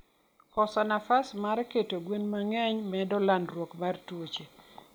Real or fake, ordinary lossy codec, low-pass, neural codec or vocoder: real; none; none; none